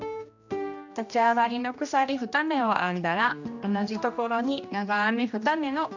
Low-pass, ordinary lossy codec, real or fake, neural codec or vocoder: 7.2 kHz; none; fake; codec, 16 kHz, 1 kbps, X-Codec, HuBERT features, trained on general audio